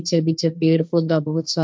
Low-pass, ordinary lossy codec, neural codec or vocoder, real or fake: none; none; codec, 16 kHz, 1.1 kbps, Voila-Tokenizer; fake